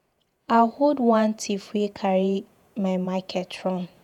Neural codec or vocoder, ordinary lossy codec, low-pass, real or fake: vocoder, 48 kHz, 128 mel bands, Vocos; none; 19.8 kHz; fake